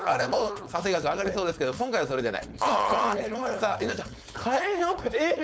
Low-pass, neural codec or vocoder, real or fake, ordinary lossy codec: none; codec, 16 kHz, 4.8 kbps, FACodec; fake; none